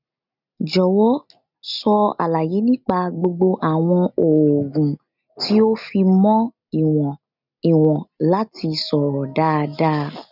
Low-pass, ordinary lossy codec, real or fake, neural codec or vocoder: 5.4 kHz; none; real; none